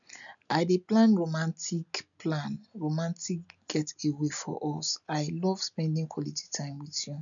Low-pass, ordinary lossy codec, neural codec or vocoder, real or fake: 7.2 kHz; none; none; real